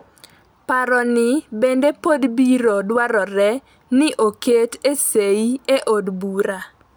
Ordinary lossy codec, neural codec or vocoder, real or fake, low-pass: none; none; real; none